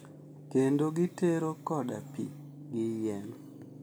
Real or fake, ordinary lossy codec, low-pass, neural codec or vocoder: real; none; none; none